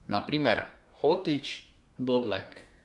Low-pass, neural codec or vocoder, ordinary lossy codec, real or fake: 10.8 kHz; codec, 24 kHz, 1 kbps, SNAC; none; fake